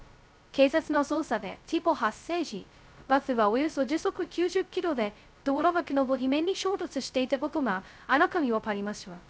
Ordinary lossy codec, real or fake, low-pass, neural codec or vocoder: none; fake; none; codec, 16 kHz, 0.2 kbps, FocalCodec